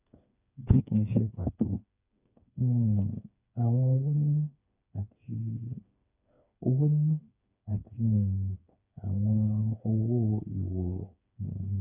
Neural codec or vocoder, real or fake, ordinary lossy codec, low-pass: codec, 16 kHz, 4 kbps, FreqCodec, smaller model; fake; none; 3.6 kHz